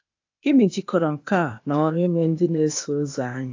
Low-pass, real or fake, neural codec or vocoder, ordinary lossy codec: 7.2 kHz; fake; codec, 16 kHz, 0.8 kbps, ZipCodec; AAC, 48 kbps